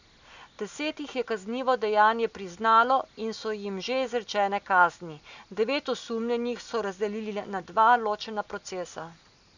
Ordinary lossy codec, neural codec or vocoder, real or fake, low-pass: none; none; real; 7.2 kHz